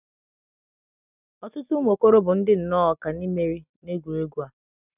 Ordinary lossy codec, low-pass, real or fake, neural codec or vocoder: none; 3.6 kHz; real; none